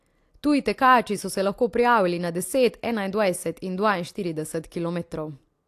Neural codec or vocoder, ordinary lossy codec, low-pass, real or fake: none; AAC, 64 kbps; 14.4 kHz; real